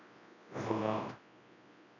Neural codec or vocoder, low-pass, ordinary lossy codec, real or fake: codec, 24 kHz, 0.9 kbps, WavTokenizer, large speech release; 7.2 kHz; none; fake